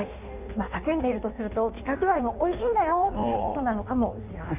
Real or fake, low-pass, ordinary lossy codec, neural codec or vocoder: fake; 3.6 kHz; none; codec, 16 kHz in and 24 kHz out, 1.1 kbps, FireRedTTS-2 codec